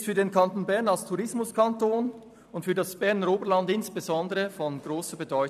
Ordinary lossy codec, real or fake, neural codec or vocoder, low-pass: none; fake; vocoder, 44.1 kHz, 128 mel bands every 256 samples, BigVGAN v2; 14.4 kHz